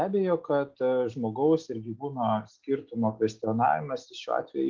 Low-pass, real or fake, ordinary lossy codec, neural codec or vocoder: 7.2 kHz; real; Opus, 16 kbps; none